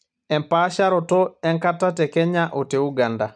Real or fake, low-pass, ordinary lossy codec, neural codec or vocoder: real; 9.9 kHz; none; none